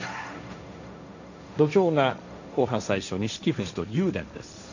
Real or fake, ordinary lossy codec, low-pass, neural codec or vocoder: fake; none; 7.2 kHz; codec, 16 kHz, 1.1 kbps, Voila-Tokenizer